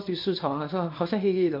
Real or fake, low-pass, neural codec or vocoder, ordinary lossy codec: fake; 5.4 kHz; codec, 24 kHz, 0.9 kbps, WavTokenizer, small release; MP3, 32 kbps